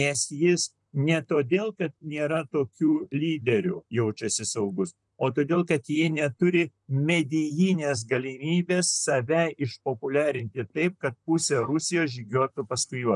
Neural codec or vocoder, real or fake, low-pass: vocoder, 44.1 kHz, 128 mel bands, Pupu-Vocoder; fake; 10.8 kHz